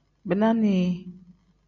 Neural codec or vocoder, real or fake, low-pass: none; real; 7.2 kHz